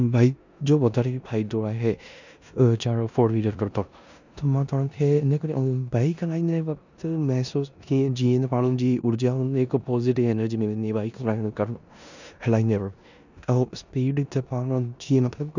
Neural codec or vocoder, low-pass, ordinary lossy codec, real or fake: codec, 16 kHz in and 24 kHz out, 0.9 kbps, LongCat-Audio-Codec, four codebook decoder; 7.2 kHz; none; fake